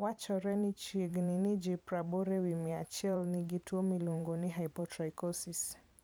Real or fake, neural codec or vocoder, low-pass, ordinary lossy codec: fake; vocoder, 44.1 kHz, 128 mel bands every 512 samples, BigVGAN v2; none; none